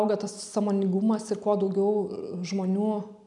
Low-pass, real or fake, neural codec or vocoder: 10.8 kHz; real; none